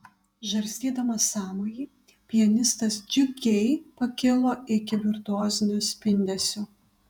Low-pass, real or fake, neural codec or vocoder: 19.8 kHz; fake; vocoder, 48 kHz, 128 mel bands, Vocos